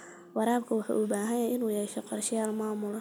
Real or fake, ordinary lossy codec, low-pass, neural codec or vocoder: real; none; none; none